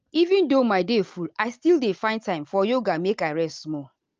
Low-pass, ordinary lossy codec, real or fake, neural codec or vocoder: 7.2 kHz; Opus, 24 kbps; real; none